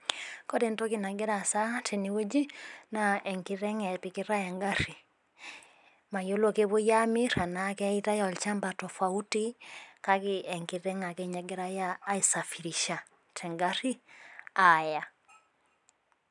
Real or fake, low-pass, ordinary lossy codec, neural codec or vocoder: real; 10.8 kHz; none; none